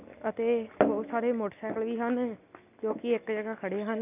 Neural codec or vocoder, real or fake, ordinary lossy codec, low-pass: none; real; none; 3.6 kHz